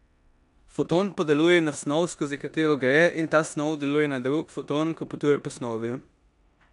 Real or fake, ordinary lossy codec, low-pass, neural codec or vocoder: fake; none; 10.8 kHz; codec, 16 kHz in and 24 kHz out, 0.9 kbps, LongCat-Audio-Codec, four codebook decoder